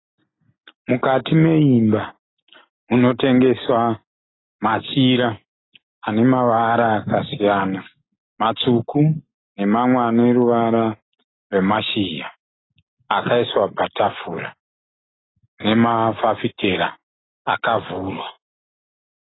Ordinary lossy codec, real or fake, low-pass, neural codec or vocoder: AAC, 16 kbps; real; 7.2 kHz; none